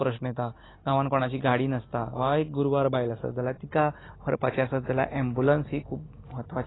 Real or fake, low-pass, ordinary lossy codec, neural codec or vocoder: real; 7.2 kHz; AAC, 16 kbps; none